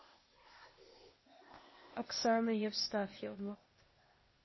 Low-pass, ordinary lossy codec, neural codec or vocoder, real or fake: 7.2 kHz; MP3, 24 kbps; codec, 16 kHz, 0.8 kbps, ZipCodec; fake